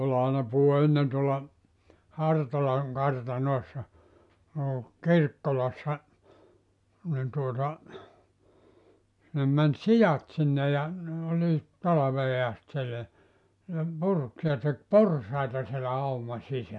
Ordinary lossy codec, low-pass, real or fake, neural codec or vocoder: MP3, 96 kbps; 10.8 kHz; real; none